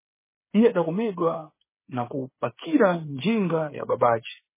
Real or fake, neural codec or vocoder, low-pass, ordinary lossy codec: fake; codec, 16 kHz, 8 kbps, FreqCodec, smaller model; 3.6 kHz; MP3, 16 kbps